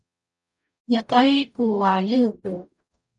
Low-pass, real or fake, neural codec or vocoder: 10.8 kHz; fake; codec, 44.1 kHz, 0.9 kbps, DAC